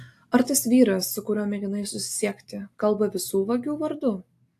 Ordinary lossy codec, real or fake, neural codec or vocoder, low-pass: AAC, 64 kbps; real; none; 14.4 kHz